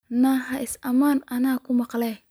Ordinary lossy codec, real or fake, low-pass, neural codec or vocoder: none; real; none; none